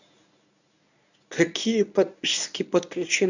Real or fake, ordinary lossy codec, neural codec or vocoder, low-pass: fake; none; codec, 24 kHz, 0.9 kbps, WavTokenizer, medium speech release version 1; 7.2 kHz